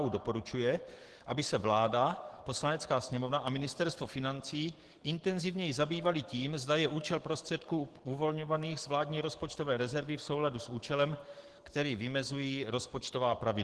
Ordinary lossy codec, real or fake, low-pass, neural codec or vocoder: Opus, 16 kbps; fake; 10.8 kHz; codec, 44.1 kHz, 7.8 kbps, DAC